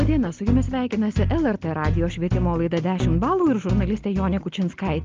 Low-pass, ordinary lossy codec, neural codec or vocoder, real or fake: 7.2 kHz; Opus, 32 kbps; none; real